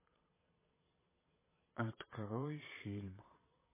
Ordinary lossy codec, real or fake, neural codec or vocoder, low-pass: MP3, 16 kbps; fake; vocoder, 22.05 kHz, 80 mel bands, Vocos; 3.6 kHz